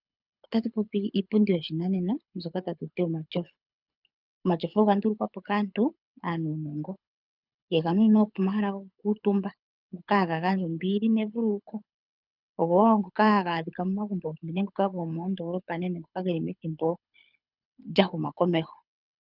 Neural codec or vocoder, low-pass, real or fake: codec, 24 kHz, 6 kbps, HILCodec; 5.4 kHz; fake